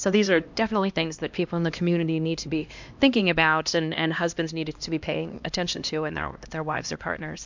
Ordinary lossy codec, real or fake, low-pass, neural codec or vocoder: MP3, 64 kbps; fake; 7.2 kHz; codec, 16 kHz, 1 kbps, X-Codec, HuBERT features, trained on LibriSpeech